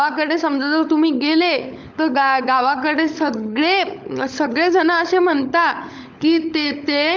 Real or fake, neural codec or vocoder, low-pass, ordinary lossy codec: fake; codec, 16 kHz, 16 kbps, FunCodec, trained on LibriTTS, 50 frames a second; none; none